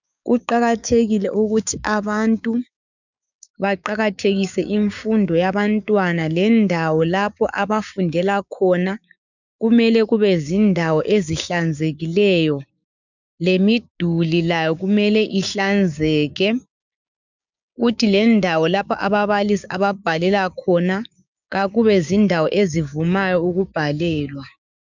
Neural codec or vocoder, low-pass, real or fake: codec, 16 kHz, 6 kbps, DAC; 7.2 kHz; fake